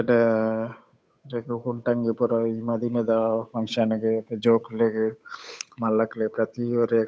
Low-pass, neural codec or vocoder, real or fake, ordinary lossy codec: none; codec, 16 kHz, 8 kbps, FunCodec, trained on Chinese and English, 25 frames a second; fake; none